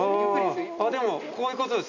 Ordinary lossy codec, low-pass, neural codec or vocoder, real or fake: none; 7.2 kHz; none; real